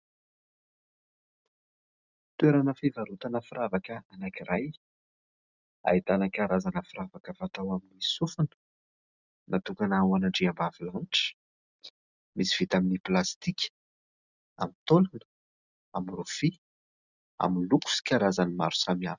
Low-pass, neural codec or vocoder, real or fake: 7.2 kHz; none; real